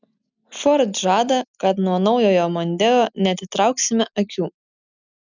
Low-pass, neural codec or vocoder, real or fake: 7.2 kHz; none; real